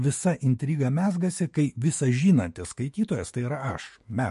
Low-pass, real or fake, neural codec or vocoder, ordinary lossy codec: 14.4 kHz; fake; autoencoder, 48 kHz, 128 numbers a frame, DAC-VAE, trained on Japanese speech; MP3, 48 kbps